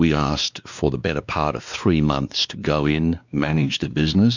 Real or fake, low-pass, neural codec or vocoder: fake; 7.2 kHz; codec, 16 kHz, 2 kbps, X-Codec, WavLM features, trained on Multilingual LibriSpeech